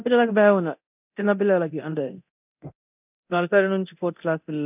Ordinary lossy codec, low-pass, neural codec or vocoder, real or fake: none; 3.6 kHz; codec, 24 kHz, 0.9 kbps, DualCodec; fake